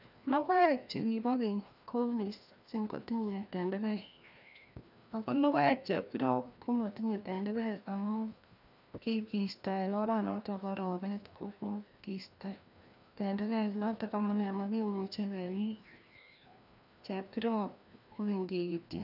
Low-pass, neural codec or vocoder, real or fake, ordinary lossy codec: 5.4 kHz; codec, 16 kHz, 1 kbps, FreqCodec, larger model; fake; none